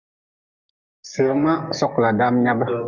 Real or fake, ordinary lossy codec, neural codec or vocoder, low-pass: fake; Opus, 64 kbps; codec, 44.1 kHz, 7.8 kbps, DAC; 7.2 kHz